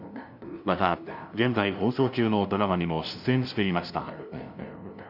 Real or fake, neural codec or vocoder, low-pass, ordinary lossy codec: fake; codec, 16 kHz, 0.5 kbps, FunCodec, trained on LibriTTS, 25 frames a second; 5.4 kHz; none